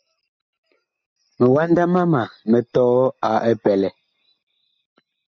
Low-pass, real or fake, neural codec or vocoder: 7.2 kHz; real; none